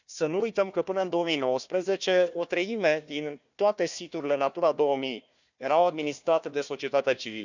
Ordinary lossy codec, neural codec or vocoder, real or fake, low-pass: none; codec, 16 kHz, 1 kbps, FunCodec, trained on Chinese and English, 50 frames a second; fake; 7.2 kHz